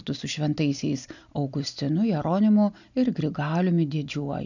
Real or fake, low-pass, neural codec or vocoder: real; 7.2 kHz; none